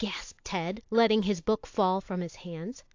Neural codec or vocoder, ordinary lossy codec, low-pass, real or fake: none; AAC, 48 kbps; 7.2 kHz; real